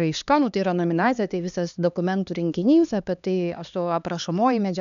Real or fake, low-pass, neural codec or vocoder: fake; 7.2 kHz; codec, 16 kHz, 2 kbps, X-Codec, HuBERT features, trained on LibriSpeech